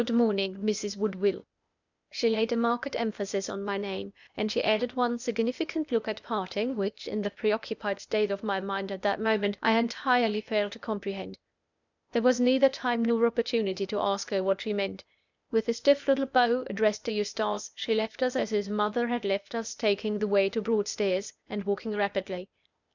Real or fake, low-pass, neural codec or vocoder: fake; 7.2 kHz; codec, 16 kHz, 0.8 kbps, ZipCodec